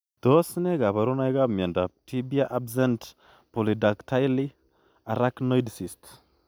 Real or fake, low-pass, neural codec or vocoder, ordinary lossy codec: real; none; none; none